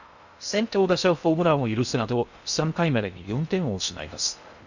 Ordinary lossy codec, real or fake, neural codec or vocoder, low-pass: none; fake; codec, 16 kHz in and 24 kHz out, 0.6 kbps, FocalCodec, streaming, 2048 codes; 7.2 kHz